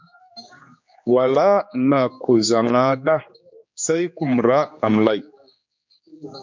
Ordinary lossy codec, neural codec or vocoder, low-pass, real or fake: MP3, 64 kbps; codec, 16 kHz, 2 kbps, X-Codec, HuBERT features, trained on general audio; 7.2 kHz; fake